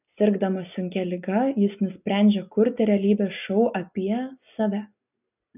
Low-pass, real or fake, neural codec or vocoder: 3.6 kHz; real; none